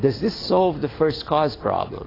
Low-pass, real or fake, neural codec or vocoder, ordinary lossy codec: 5.4 kHz; real; none; AAC, 24 kbps